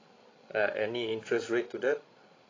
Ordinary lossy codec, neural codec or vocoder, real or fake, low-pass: AAC, 32 kbps; codec, 16 kHz, 16 kbps, FreqCodec, larger model; fake; 7.2 kHz